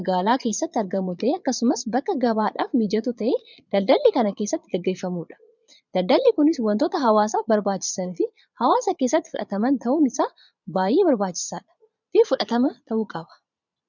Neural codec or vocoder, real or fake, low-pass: vocoder, 22.05 kHz, 80 mel bands, Vocos; fake; 7.2 kHz